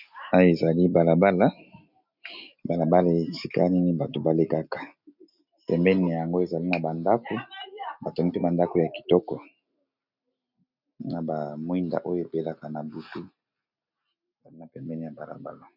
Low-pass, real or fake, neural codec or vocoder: 5.4 kHz; real; none